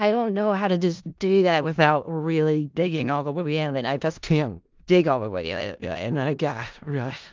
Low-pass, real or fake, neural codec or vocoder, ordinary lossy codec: 7.2 kHz; fake; codec, 16 kHz in and 24 kHz out, 0.4 kbps, LongCat-Audio-Codec, four codebook decoder; Opus, 24 kbps